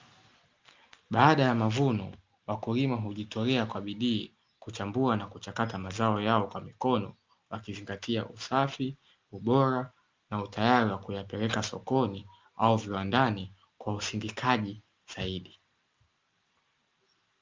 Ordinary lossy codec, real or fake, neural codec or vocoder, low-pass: Opus, 24 kbps; real; none; 7.2 kHz